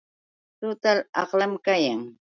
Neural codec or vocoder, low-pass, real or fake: none; 7.2 kHz; real